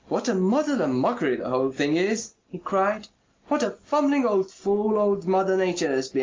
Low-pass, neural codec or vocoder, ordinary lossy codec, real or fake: 7.2 kHz; none; Opus, 32 kbps; real